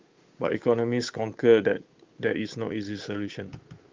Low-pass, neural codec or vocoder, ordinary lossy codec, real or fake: 7.2 kHz; codec, 16 kHz, 8 kbps, FunCodec, trained on Chinese and English, 25 frames a second; Opus, 32 kbps; fake